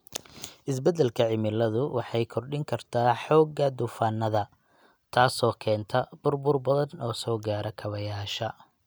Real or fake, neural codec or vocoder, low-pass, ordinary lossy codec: real; none; none; none